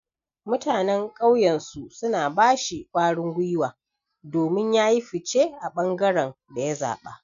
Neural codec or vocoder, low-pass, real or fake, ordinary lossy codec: none; 7.2 kHz; real; none